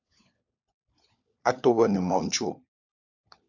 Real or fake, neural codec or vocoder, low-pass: fake; codec, 16 kHz, 4 kbps, FunCodec, trained on LibriTTS, 50 frames a second; 7.2 kHz